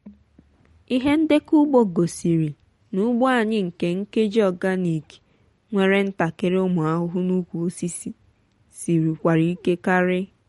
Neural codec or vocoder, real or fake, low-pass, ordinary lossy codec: none; real; 14.4 kHz; MP3, 48 kbps